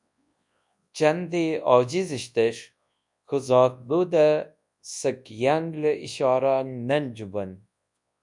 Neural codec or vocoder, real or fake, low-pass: codec, 24 kHz, 0.9 kbps, WavTokenizer, large speech release; fake; 10.8 kHz